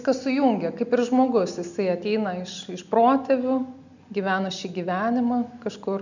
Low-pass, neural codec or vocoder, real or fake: 7.2 kHz; none; real